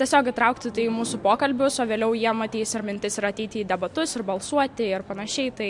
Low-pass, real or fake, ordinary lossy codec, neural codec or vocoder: 10.8 kHz; real; MP3, 64 kbps; none